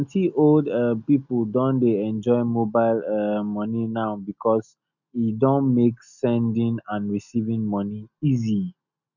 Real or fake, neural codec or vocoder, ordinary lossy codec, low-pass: real; none; none; 7.2 kHz